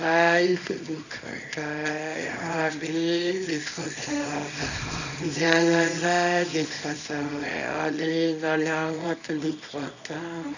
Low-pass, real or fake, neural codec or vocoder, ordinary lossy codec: 7.2 kHz; fake; codec, 24 kHz, 0.9 kbps, WavTokenizer, small release; none